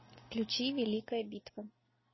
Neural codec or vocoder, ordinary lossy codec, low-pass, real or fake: none; MP3, 24 kbps; 7.2 kHz; real